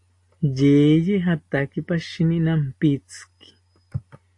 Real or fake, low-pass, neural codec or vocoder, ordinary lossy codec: real; 10.8 kHz; none; AAC, 48 kbps